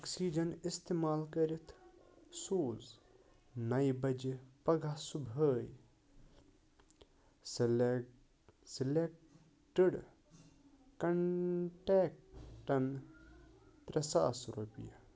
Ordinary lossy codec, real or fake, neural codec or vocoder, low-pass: none; real; none; none